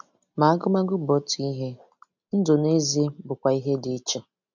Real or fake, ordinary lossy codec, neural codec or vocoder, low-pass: real; none; none; 7.2 kHz